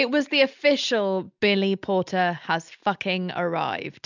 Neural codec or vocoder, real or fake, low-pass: none; real; 7.2 kHz